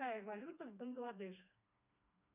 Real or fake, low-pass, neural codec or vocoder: fake; 3.6 kHz; codec, 16 kHz, 2 kbps, FreqCodec, smaller model